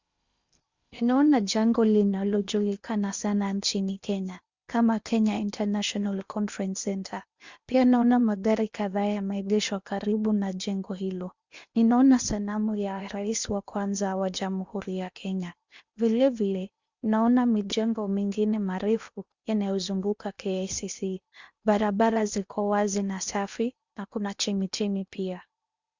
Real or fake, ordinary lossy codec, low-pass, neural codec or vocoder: fake; Opus, 64 kbps; 7.2 kHz; codec, 16 kHz in and 24 kHz out, 0.8 kbps, FocalCodec, streaming, 65536 codes